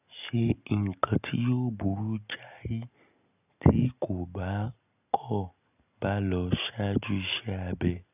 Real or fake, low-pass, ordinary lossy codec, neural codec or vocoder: real; 3.6 kHz; none; none